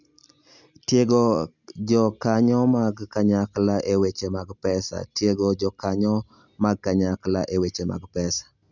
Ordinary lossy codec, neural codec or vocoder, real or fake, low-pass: none; none; real; 7.2 kHz